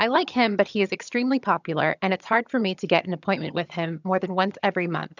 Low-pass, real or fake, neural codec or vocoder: 7.2 kHz; fake; vocoder, 22.05 kHz, 80 mel bands, HiFi-GAN